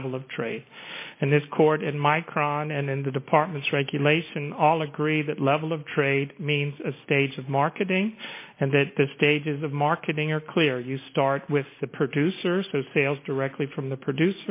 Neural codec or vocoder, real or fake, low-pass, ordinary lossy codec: none; real; 3.6 kHz; MP3, 24 kbps